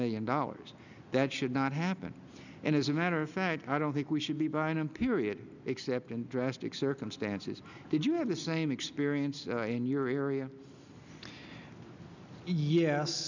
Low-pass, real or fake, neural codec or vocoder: 7.2 kHz; real; none